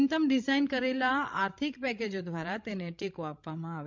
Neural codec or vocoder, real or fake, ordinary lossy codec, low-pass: codec, 16 kHz, 16 kbps, FreqCodec, larger model; fake; MP3, 64 kbps; 7.2 kHz